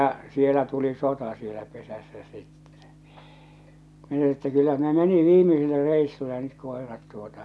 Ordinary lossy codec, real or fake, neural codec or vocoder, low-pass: none; real; none; none